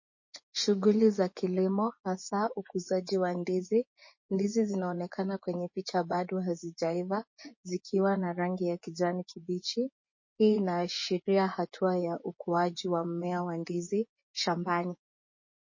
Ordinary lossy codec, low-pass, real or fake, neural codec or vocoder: MP3, 32 kbps; 7.2 kHz; fake; vocoder, 24 kHz, 100 mel bands, Vocos